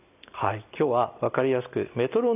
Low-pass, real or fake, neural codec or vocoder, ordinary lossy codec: 3.6 kHz; real; none; none